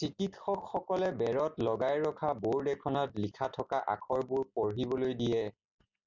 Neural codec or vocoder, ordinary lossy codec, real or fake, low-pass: none; Opus, 64 kbps; real; 7.2 kHz